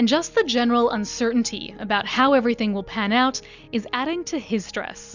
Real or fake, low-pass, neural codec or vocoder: real; 7.2 kHz; none